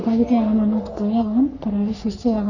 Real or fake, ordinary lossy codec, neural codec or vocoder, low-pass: fake; none; codec, 44.1 kHz, 3.4 kbps, Pupu-Codec; 7.2 kHz